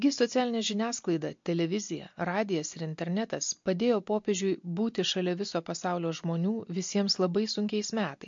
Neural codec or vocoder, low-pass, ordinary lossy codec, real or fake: none; 7.2 kHz; MP3, 48 kbps; real